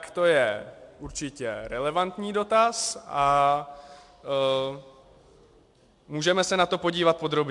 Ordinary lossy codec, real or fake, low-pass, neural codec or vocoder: MP3, 64 kbps; real; 10.8 kHz; none